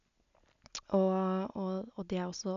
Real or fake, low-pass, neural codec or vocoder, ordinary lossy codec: real; 7.2 kHz; none; MP3, 96 kbps